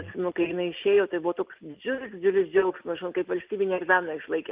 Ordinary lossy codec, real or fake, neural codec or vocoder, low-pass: Opus, 24 kbps; real; none; 3.6 kHz